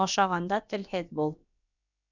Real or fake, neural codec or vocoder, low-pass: fake; codec, 16 kHz, about 1 kbps, DyCAST, with the encoder's durations; 7.2 kHz